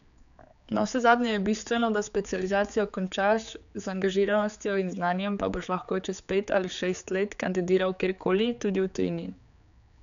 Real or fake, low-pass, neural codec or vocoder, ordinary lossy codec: fake; 7.2 kHz; codec, 16 kHz, 4 kbps, X-Codec, HuBERT features, trained on general audio; none